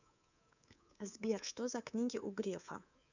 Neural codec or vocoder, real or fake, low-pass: codec, 24 kHz, 3.1 kbps, DualCodec; fake; 7.2 kHz